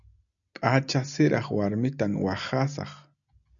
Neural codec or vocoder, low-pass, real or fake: none; 7.2 kHz; real